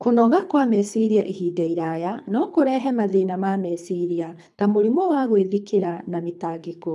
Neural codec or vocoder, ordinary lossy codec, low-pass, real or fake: codec, 24 kHz, 3 kbps, HILCodec; none; none; fake